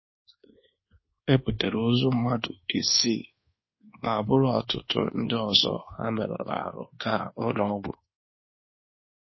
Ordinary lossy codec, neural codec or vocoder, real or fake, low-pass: MP3, 24 kbps; codec, 24 kHz, 1.2 kbps, DualCodec; fake; 7.2 kHz